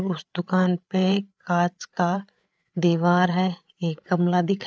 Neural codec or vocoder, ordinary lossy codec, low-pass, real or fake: codec, 16 kHz, 8 kbps, FreqCodec, larger model; none; none; fake